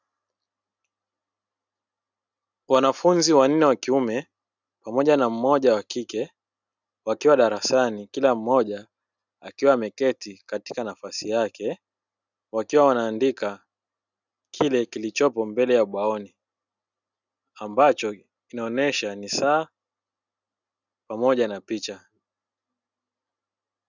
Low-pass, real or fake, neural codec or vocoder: 7.2 kHz; real; none